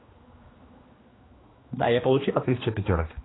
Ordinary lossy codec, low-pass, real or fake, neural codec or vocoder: AAC, 16 kbps; 7.2 kHz; fake; codec, 16 kHz, 2 kbps, X-Codec, HuBERT features, trained on general audio